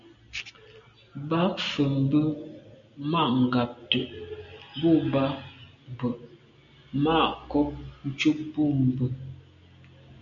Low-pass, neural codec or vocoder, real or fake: 7.2 kHz; none; real